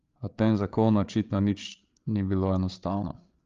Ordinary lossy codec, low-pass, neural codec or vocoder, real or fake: Opus, 32 kbps; 7.2 kHz; codec, 16 kHz, 8 kbps, FreqCodec, larger model; fake